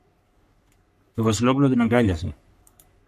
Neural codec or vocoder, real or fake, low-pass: codec, 44.1 kHz, 3.4 kbps, Pupu-Codec; fake; 14.4 kHz